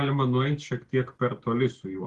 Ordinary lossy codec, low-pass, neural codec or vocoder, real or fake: Opus, 16 kbps; 10.8 kHz; none; real